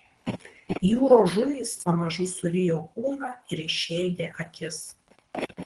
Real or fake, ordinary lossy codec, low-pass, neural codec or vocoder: fake; Opus, 24 kbps; 10.8 kHz; codec, 24 kHz, 3 kbps, HILCodec